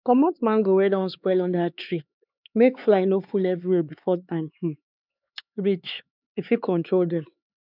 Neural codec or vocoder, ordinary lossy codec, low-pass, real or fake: codec, 16 kHz, 4 kbps, X-Codec, HuBERT features, trained on LibriSpeech; none; 5.4 kHz; fake